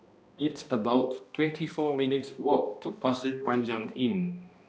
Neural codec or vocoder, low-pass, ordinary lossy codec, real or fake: codec, 16 kHz, 1 kbps, X-Codec, HuBERT features, trained on balanced general audio; none; none; fake